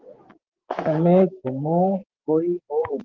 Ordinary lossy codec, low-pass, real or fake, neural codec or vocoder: Opus, 16 kbps; 7.2 kHz; fake; vocoder, 24 kHz, 100 mel bands, Vocos